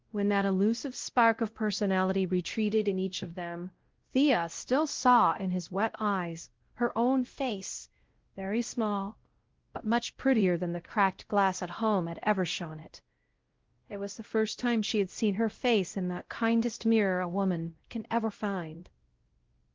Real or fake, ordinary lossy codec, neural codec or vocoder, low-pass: fake; Opus, 16 kbps; codec, 16 kHz, 0.5 kbps, X-Codec, WavLM features, trained on Multilingual LibriSpeech; 7.2 kHz